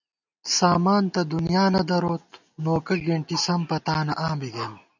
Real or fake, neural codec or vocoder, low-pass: real; none; 7.2 kHz